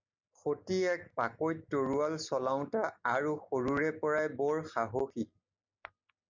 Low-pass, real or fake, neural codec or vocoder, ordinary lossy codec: 7.2 kHz; real; none; MP3, 64 kbps